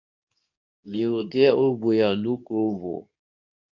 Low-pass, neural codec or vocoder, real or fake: 7.2 kHz; codec, 24 kHz, 0.9 kbps, WavTokenizer, medium speech release version 2; fake